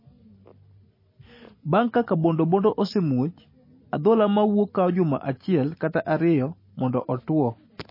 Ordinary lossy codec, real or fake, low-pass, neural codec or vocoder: MP3, 24 kbps; real; 5.4 kHz; none